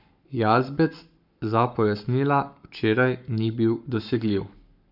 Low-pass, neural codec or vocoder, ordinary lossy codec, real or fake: 5.4 kHz; codec, 44.1 kHz, 7.8 kbps, Pupu-Codec; none; fake